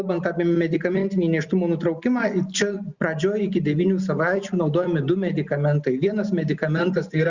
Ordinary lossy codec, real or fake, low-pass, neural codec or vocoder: Opus, 64 kbps; real; 7.2 kHz; none